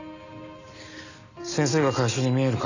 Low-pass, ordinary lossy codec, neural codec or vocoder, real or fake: 7.2 kHz; none; none; real